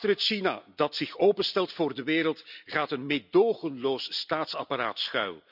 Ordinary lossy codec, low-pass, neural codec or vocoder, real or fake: none; 5.4 kHz; none; real